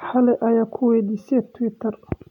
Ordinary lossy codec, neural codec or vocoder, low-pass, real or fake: none; none; 19.8 kHz; real